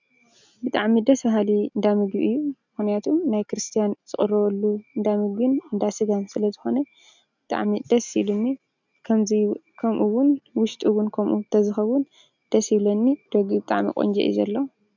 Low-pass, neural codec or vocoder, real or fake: 7.2 kHz; none; real